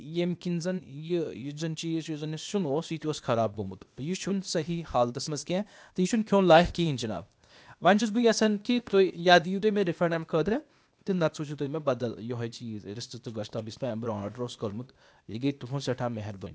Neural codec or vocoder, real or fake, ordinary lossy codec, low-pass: codec, 16 kHz, 0.8 kbps, ZipCodec; fake; none; none